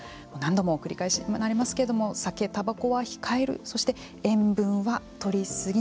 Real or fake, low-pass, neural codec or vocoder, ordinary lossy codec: real; none; none; none